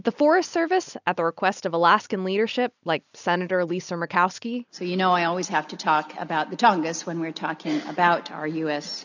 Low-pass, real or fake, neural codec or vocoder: 7.2 kHz; real; none